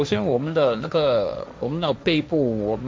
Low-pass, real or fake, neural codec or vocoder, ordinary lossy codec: none; fake; codec, 16 kHz, 1.1 kbps, Voila-Tokenizer; none